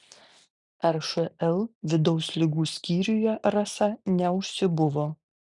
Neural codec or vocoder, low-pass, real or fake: none; 10.8 kHz; real